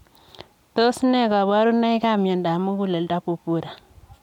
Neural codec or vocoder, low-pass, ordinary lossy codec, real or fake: none; 19.8 kHz; none; real